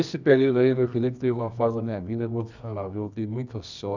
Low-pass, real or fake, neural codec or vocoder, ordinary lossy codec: 7.2 kHz; fake; codec, 24 kHz, 0.9 kbps, WavTokenizer, medium music audio release; none